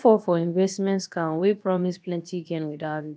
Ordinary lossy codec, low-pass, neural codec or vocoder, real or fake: none; none; codec, 16 kHz, about 1 kbps, DyCAST, with the encoder's durations; fake